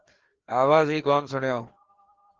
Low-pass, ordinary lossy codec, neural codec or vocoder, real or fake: 7.2 kHz; Opus, 16 kbps; codec, 16 kHz, 2 kbps, FreqCodec, larger model; fake